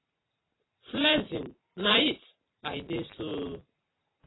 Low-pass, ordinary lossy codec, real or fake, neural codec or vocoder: 7.2 kHz; AAC, 16 kbps; real; none